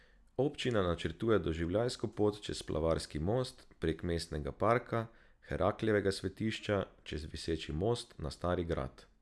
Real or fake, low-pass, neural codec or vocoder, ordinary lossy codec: real; none; none; none